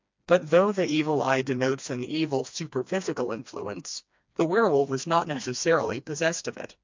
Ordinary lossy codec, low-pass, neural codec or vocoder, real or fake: MP3, 64 kbps; 7.2 kHz; codec, 16 kHz, 2 kbps, FreqCodec, smaller model; fake